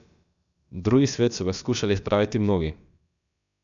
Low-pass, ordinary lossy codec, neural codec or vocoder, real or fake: 7.2 kHz; none; codec, 16 kHz, about 1 kbps, DyCAST, with the encoder's durations; fake